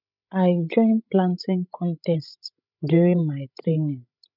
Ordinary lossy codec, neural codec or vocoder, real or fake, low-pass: none; codec, 16 kHz, 16 kbps, FreqCodec, larger model; fake; 5.4 kHz